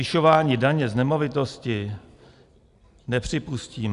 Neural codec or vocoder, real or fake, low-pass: none; real; 10.8 kHz